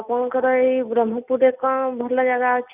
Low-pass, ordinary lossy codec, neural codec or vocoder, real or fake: 3.6 kHz; none; none; real